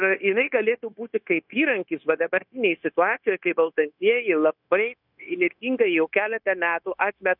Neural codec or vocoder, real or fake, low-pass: codec, 16 kHz, 0.9 kbps, LongCat-Audio-Codec; fake; 5.4 kHz